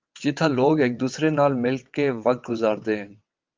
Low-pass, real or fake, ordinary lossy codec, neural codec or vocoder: 7.2 kHz; fake; Opus, 24 kbps; vocoder, 22.05 kHz, 80 mel bands, WaveNeXt